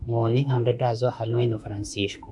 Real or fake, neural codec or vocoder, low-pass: fake; autoencoder, 48 kHz, 32 numbers a frame, DAC-VAE, trained on Japanese speech; 10.8 kHz